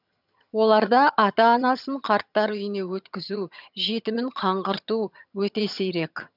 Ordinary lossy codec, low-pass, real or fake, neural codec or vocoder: none; 5.4 kHz; fake; vocoder, 22.05 kHz, 80 mel bands, HiFi-GAN